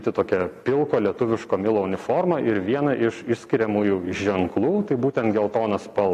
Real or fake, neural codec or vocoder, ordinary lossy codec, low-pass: real; none; MP3, 96 kbps; 14.4 kHz